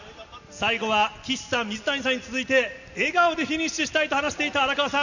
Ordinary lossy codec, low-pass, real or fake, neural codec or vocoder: none; 7.2 kHz; real; none